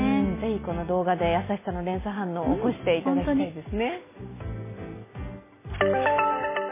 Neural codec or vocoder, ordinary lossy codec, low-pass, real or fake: none; MP3, 16 kbps; 3.6 kHz; real